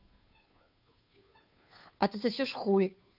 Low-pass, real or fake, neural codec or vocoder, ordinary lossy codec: 5.4 kHz; fake; codec, 16 kHz, 2 kbps, FunCodec, trained on Chinese and English, 25 frames a second; none